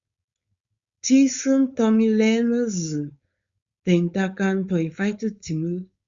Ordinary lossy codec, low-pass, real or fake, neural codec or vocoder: Opus, 64 kbps; 7.2 kHz; fake; codec, 16 kHz, 4.8 kbps, FACodec